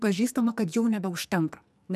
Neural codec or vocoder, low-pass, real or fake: codec, 44.1 kHz, 2.6 kbps, SNAC; 14.4 kHz; fake